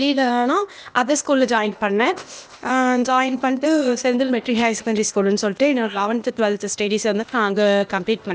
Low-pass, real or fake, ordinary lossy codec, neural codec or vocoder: none; fake; none; codec, 16 kHz, 0.8 kbps, ZipCodec